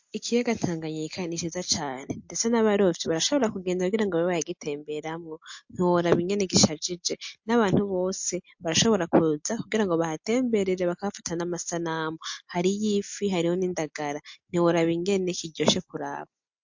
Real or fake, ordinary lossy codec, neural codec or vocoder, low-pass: real; MP3, 48 kbps; none; 7.2 kHz